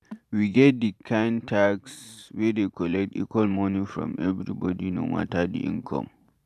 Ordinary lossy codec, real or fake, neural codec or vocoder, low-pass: none; fake; vocoder, 44.1 kHz, 128 mel bands every 512 samples, BigVGAN v2; 14.4 kHz